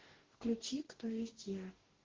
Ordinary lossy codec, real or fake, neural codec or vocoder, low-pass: Opus, 16 kbps; fake; codec, 44.1 kHz, 2.6 kbps, DAC; 7.2 kHz